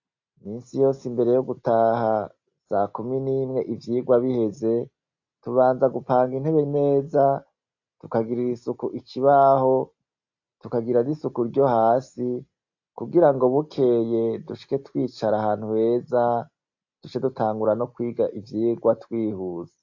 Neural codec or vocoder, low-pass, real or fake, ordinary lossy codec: none; 7.2 kHz; real; AAC, 48 kbps